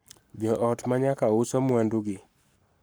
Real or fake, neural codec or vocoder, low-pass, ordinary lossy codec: fake; codec, 44.1 kHz, 7.8 kbps, Pupu-Codec; none; none